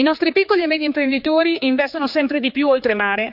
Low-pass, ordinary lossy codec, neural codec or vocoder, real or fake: 5.4 kHz; none; codec, 16 kHz, 4 kbps, X-Codec, HuBERT features, trained on general audio; fake